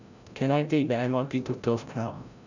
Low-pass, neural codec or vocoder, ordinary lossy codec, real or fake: 7.2 kHz; codec, 16 kHz, 0.5 kbps, FreqCodec, larger model; none; fake